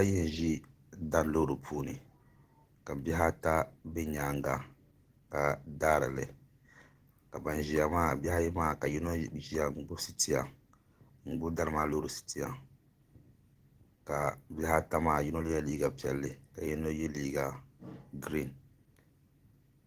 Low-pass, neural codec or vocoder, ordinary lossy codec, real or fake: 14.4 kHz; none; Opus, 16 kbps; real